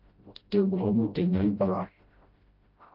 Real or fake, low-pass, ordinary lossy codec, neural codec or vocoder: fake; 5.4 kHz; Opus, 32 kbps; codec, 16 kHz, 0.5 kbps, FreqCodec, smaller model